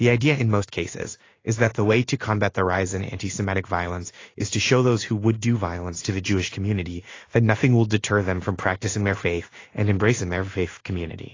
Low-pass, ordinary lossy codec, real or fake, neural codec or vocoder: 7.2 kHz; AAC, 32 kbps; fake; codec, 16 kHz in and 24 kHz out, 1 kbps, XY-Tokenizer